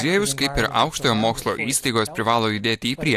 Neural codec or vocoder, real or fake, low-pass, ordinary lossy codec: none; real; 14.4 kHz; AAC, 64 kbps